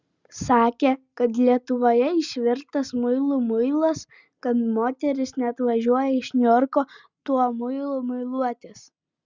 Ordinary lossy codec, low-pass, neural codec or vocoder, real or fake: Opus, 64 kbps; 7.2 kHz; none; real